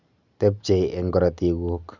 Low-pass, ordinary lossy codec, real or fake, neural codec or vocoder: 7.2 kHz; none; real; none